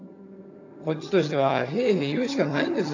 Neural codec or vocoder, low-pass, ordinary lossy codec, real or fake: vocoder, 22.05 kHz, 80 mel bands, HiFi-GAN; 7.2 kHz; AAC, 48 kbps; fake